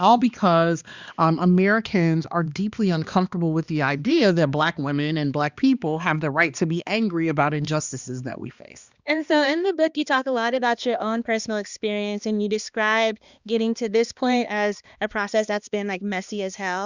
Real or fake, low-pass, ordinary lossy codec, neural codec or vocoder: fake; 7.2 kHz; Opus, 64 kbps; codec, 16 kHz, 2 kbps, X-Codec, HuBERT features, trained on balanced general audio